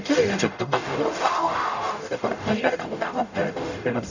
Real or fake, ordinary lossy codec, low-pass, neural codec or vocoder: fake; none; 7.2 kHz; codec, 44.1 kHz, 0.9 kbps, DAC